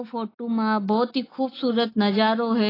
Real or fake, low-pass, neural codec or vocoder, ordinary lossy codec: real; 5.4 kHz; none; AAC, 24 kbps